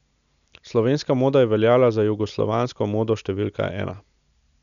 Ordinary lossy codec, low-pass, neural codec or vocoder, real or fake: none; 7.2 kHz; none; real